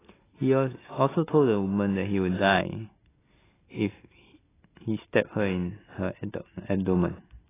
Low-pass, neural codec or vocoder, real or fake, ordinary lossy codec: 3.6 kHz; none; real; AAC, 16 kbps